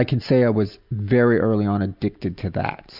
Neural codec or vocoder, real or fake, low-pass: none; real; 5.4 kHz